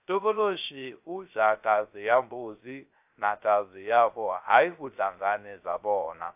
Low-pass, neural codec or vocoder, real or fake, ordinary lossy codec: 3.6 kHz; codec, 16 kHz, 0.3 kbps, FocalCodec; fake; AAC, 32 kbps